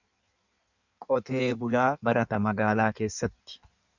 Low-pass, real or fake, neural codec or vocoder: 7.2 kHz; fake; codec, 16 kHz in and 24 kHz out, 1.1 kbps, FireRedTTS-2 codec